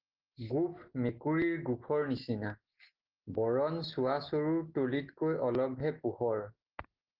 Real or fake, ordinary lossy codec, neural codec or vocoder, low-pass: real; Opus, 16 kbps; none; 5.4 kHz